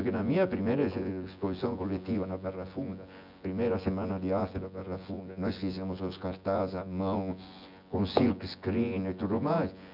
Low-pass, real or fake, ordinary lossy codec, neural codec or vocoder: 5.4 kHz; fake; none; vocoder, 24 kHz, 100 mel bands, Vocos